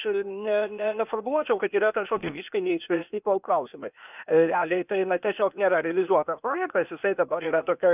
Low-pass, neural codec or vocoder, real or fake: 3.6 kHz; codec, 16 kHz, 0.8 kbps, ZipCodec; fake